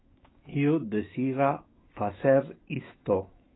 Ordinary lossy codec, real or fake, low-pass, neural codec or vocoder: AAC, 16 kbps; fake; 7.2 kHz; autoencoder, 48 kHz, 128 numbers a frame, DAC-VAE, trained on Japanese speech